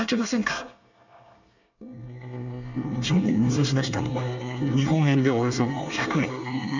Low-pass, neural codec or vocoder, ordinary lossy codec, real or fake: 7.2 kHz; codec, 24 kHz, 1 kbps, SNAC; none; fake